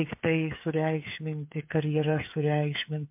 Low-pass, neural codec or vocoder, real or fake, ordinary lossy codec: 3.6 kHz; codec, 16 kHz, 8 kbps, FreqCodec, smaller model; fake; MP3, 32 kbps